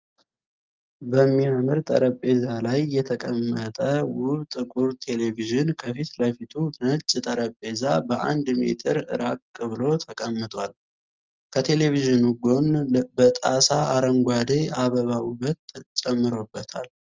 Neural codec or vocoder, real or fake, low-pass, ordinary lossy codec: none; real; 7.2 kHz; Opus, 32 kbps